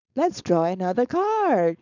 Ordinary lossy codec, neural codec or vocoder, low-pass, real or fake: none; codec, 16 kHz, 4.8 kbps, FACodec; 7.2 kHz; fake